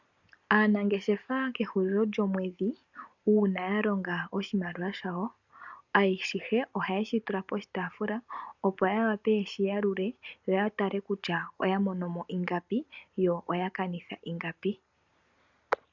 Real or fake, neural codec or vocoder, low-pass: real; none; 7.2 kHz